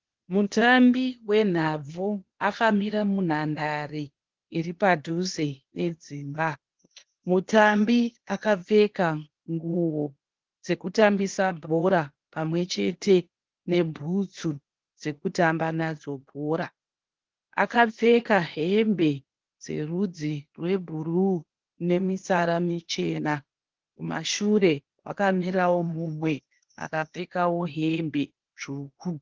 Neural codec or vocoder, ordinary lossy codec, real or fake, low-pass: codec, 16 kHz, 0.8 kbps, ZipCodec; Opus, 16 kbps; fake; 7.2 kHz